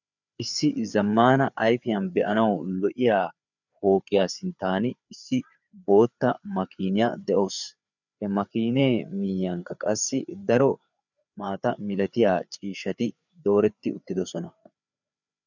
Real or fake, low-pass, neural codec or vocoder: fake; 7.2 kHz; codec, 16 kHz, 4 kbps, FreqCodec, larger model